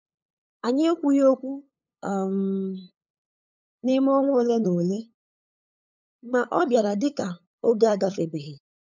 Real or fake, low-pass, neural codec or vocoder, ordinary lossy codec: fake; 7.2 kHz; codec, 16 kHz, 8 kbps, FunCodec, trained on LibriTTS, 25 frames a second; none